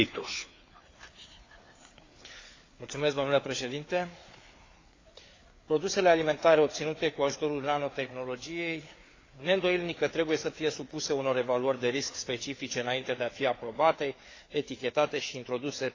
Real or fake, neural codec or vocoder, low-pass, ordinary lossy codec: fake; codec, 16 kHz, 4 kbps, FreqCodec, larger model; 7.2 kHz; AAC, 32 kbps